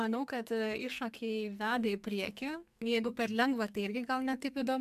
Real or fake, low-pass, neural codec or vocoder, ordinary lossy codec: fake; 14.4 kHz; codec, 44.1 kHz, 2.6 kbps, SNAC; MP3, 96 kbps